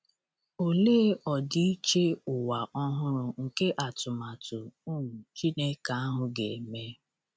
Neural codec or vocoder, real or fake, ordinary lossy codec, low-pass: none; real; none; none